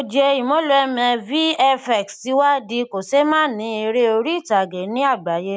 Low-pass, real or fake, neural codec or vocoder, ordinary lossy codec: none; real; none; none